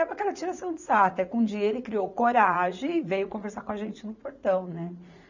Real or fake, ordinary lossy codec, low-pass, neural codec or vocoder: fake; none; 7.2 kHz; vocoder, 44.1 kHz, 80 mel bands, Vocos